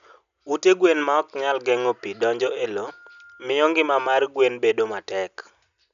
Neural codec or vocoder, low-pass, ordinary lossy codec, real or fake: none; 7.2 kHz; none; real